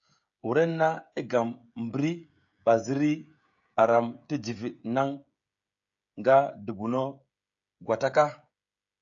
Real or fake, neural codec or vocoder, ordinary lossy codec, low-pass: fake; codec, 16 kHz, 16 kbps, FreqCodec, smaller model; AAC, 64 kbps; 7.2 kHz